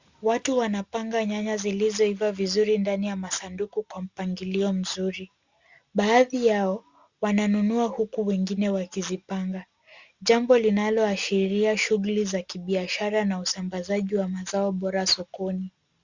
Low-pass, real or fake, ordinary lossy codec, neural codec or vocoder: 7.2 kHz; real; Opus, 64 kbps; none